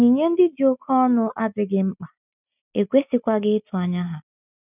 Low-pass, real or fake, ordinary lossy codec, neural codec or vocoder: 3.6 kHz; real; none; none